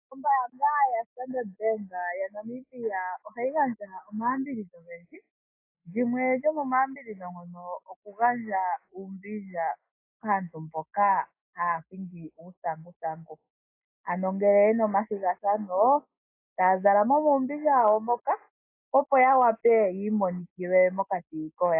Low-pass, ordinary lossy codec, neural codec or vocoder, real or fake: 3.6 kHz; AAC, 24 kbps; none; real